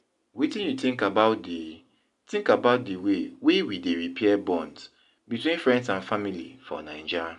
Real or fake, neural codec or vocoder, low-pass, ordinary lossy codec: real; none; 10.8 kHz; none